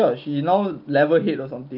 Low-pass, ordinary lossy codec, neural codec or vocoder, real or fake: 5.4 kHz; Opus, 24 kbps; none; real